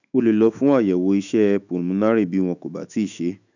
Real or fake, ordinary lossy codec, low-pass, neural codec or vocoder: fake; none; 7.2 kHz; autoencoder, 48 kHz, 128 numbers a frame, DAC-VAE, trained on Japanese speech